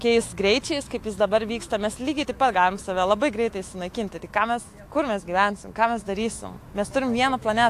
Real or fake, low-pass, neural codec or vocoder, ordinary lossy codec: fake; 14.4 kHz; autoencoder, 48 kHz, 128 numbers a frame, DAC-VAE, trained on Japanese speech; AAC, 64 kbps